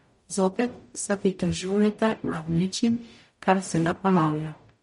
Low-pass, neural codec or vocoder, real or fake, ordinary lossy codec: 19.8 kHz; codec, 44.1 kHz, 0.9 kbps, DAC; fake; MP3, 48 kbps